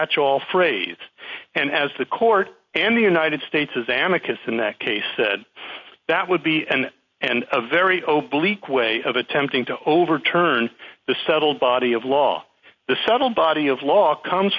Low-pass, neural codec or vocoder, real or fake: 7.2 kHz; none; real